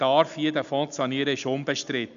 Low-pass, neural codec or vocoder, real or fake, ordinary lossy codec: 7.2 kHz; none; real; none